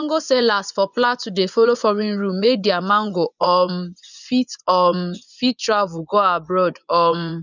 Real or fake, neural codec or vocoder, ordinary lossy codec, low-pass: fake; vocoder, 24 kHz, 100 mel bands, Vocos; none; 7.2 kHz